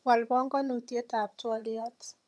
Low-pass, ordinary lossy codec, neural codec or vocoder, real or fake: none; none; vocoder, 22.05 kHz, 80 mel bands, HiFi-GAN; fake